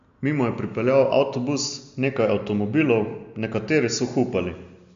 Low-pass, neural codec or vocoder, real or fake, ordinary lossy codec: 7.2 kHz; none; real; MP3, 64 kbps